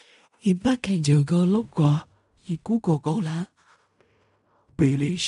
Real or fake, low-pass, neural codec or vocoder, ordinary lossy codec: fake; 10.8 kHz; codec, 16 kHz in and 24 kHz out, 0.4 kbps, LongCat-Audio-Codec, fine tuned four codebook decoder; none